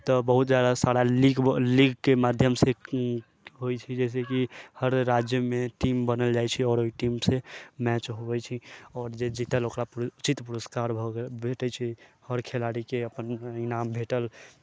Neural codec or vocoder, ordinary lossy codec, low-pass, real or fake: none; none; none; real